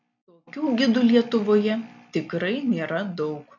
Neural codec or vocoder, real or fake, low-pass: none; real; 7.2 kHz